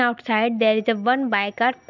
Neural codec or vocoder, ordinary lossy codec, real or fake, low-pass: none; none; real; 7.2 kHz